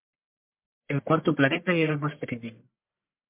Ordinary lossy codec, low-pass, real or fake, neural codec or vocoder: MP3, 32 kbps; 3.6 kHz; fake; codec, 44.1 kHz, 1.7 kbps, Pupu-Codec